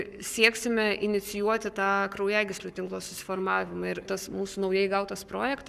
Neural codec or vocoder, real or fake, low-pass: codec, 44.1 kHz, 7.8 kbps, Pupu-Codec; fake; 14.4 kHz